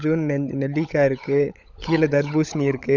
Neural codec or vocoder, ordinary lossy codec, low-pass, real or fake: codec, 16 kHz, 16 kbps, FunCodec, trained on LibriTTS, 50 frames a second; none; 7.2 kHz; fake